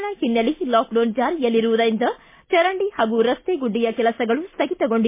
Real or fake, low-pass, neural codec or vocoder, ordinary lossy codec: real; 3.6 kHz; none; MP3, 24 kbps